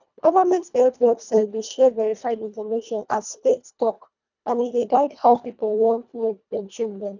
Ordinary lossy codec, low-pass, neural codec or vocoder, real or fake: none; 7.2 kHz; codec, 24 kHz, 1.5 kbps, HILCodec; fake